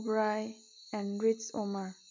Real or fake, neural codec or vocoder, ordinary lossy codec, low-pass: real; none; MP3, 64 kbps; 7.2 kHz